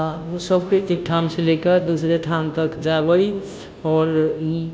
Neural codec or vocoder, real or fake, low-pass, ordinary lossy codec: codec, 16 kHz, 0.5 kbps, FunCodec, trained on Chinese and English, 25 frames a second; fake; none; none